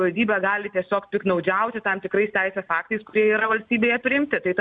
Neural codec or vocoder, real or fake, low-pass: none; real; 9.9 kHz